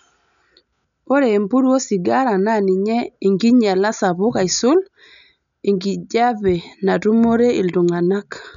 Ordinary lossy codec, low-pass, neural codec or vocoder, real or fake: none; 7.2 kHz; none; real